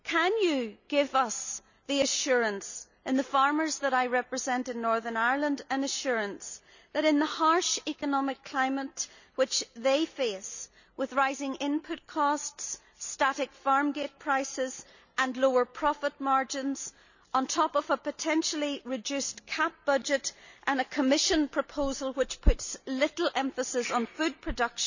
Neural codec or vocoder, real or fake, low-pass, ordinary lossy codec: none; real; 7.2 kHz; none